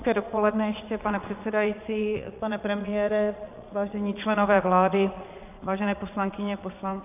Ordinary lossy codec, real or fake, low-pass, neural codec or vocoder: AAC, 32 kbps; fake; 3.6 kHz; vocoder, 22.05 kHz, 80 mel bands, Vocos